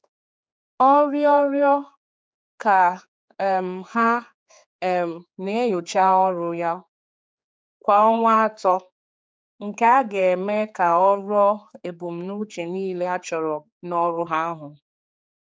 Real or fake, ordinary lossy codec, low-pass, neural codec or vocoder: fake; none; none; codec, 16 kHz, 4 kbps, X-Codec, HuBERT features, trained on general audio